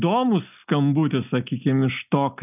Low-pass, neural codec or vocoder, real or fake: 3.6 kHz; none; real